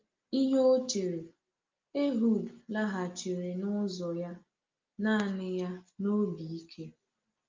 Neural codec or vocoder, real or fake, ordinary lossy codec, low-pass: none; real; Opus, 24 kbps; 7.2 kHz